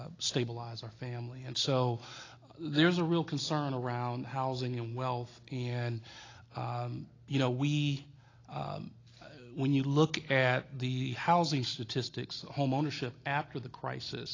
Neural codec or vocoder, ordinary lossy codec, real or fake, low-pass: none; AAC, 32 kbps; real; 7.2 kHz